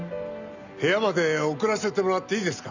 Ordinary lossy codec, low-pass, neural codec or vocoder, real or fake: none; 7.2 kHz; none; real